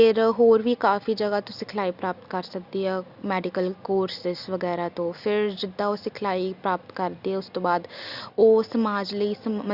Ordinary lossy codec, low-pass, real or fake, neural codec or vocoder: Opus, 64 kbps; 5.4 kHz; real; none